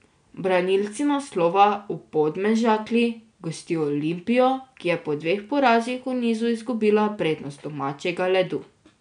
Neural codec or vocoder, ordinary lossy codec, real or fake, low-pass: none; none; real; 9.9 kHz